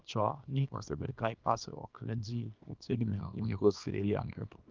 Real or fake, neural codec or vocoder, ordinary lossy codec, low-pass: fake; codec, 24 kHz, 0.9 kbps, WavTokenizer, small release; Opus, 32 kbps; 7.2 kHz